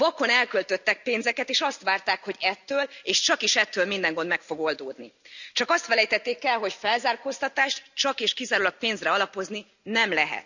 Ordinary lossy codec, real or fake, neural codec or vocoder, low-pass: none; real; none; 7.2 kHz